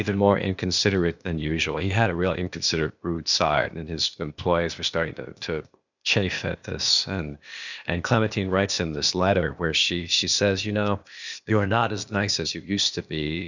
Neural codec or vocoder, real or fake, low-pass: codec, 16 kHz, 0.8 kbps, ZipCodec; fake; 7.2 kHz